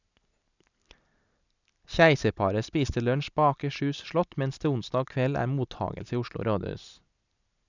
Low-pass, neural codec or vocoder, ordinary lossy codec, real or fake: 7.2 kHz; none; none; real